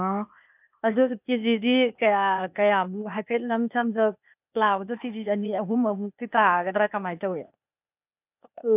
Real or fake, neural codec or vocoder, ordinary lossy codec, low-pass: fake; codec, 16 kHz, 0.8 kbps, ZipCodec; none; 3.6 kHz